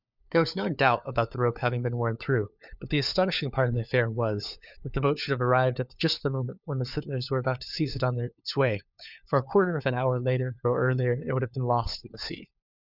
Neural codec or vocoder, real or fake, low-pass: codec, 16 kHz, 4 kbps, FunCodec, trained on LibriTTS, 50 frames a second; fake; 5.4 kHz